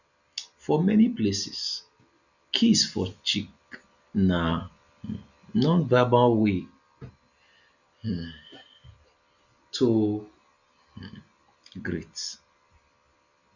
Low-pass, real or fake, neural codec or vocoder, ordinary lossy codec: 7.2 kHz; real; none; none